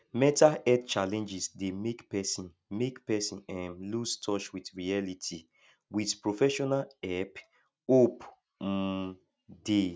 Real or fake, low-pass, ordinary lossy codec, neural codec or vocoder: real; none; none; none